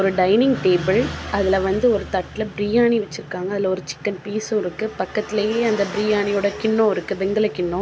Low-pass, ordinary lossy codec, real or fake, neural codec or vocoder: none; none; real; none